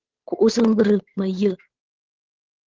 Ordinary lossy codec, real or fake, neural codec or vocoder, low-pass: Opus, 16 kbps; fake; codec, 16 kHz, 8 kbps, FunCodec, trained on Chinese and English, 25 frames a second; 7.2 kHz